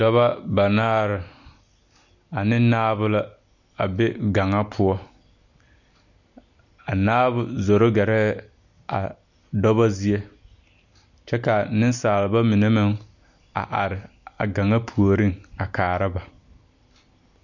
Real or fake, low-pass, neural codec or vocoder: real; 7.2 kHz; none